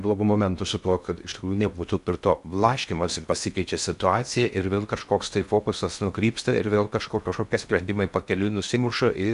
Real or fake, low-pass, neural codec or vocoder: fake; 10.8 kHz; codec, 16 kHz in and 24 kHz out, 0.6 kbps, FocalCodec, streaming, 2048 codes